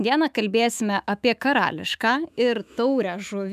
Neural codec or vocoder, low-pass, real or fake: autoencoder, 48 kHz, 128 numbers a frame, DAC-VAE, trained on Japanese speech; 14.4 kHz; fake